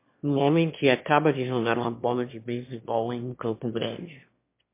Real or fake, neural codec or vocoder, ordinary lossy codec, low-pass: fake; autoencoder, 22.05 kHz, a latent of 192 numbers a frame, VITS, trained on one speaker; MP3, 24 kbps; 3.6 kHz